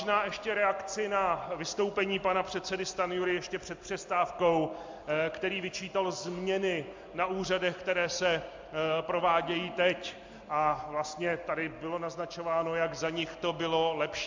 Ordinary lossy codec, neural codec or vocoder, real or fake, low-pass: MP3, 64 kbps; none; real; 7.2 kHz